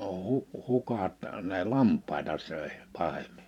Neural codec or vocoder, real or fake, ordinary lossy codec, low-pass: vocoder, 44.1 kHz, 128 mel bands every 512 samples, BigVGAN v2; fake; none; 19.8 kHz